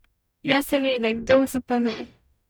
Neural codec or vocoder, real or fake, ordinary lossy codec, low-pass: codec, 44.1 kHz, 0.9 kbps, DAC; fake; none; none